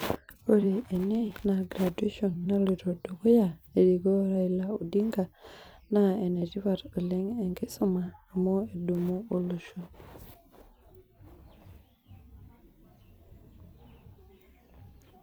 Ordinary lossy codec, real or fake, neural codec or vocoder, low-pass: none; fake; vocoder, 44.1 kHz, 128 mel bands every 256 samples, BigVGAN v2; none